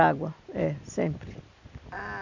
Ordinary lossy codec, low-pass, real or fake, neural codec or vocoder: none; 7.2 kHz; real; none